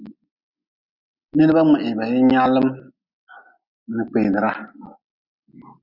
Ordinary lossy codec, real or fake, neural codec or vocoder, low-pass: Opus, 64 kbps; real; none; 5.4 kHz